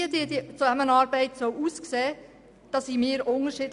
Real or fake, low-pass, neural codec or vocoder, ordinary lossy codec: real; 10.8 kHz; none; none